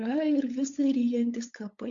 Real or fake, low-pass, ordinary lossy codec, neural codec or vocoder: fake; 7.2 kHz; Opus, 64 kbps; codec, 16 kHz, 8 kbps, FunCodec, trained on LibriTTS, 25 frames a second